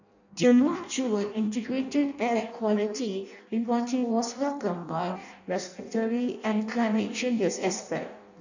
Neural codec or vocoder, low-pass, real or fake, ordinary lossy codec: codec, 16 kHz in and 24 kHz out, 0.6 kbps, FireRedTTS-2 codec; 7.2 kHz; fake; none